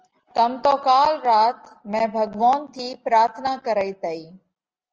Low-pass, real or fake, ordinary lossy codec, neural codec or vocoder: 7.2 kHz; real; Opus, 24 kbps; none